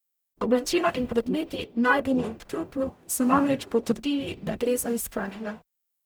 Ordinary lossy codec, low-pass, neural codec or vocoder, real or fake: none; none; codec, 44.1 kHz, 0.9 kbps, DAC; fake